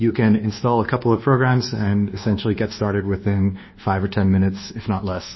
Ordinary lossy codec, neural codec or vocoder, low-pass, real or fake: MP3, 24 kbps; codec, 24 kHz, 1.2 kbps, DualCodec; 7.2 kHz; fake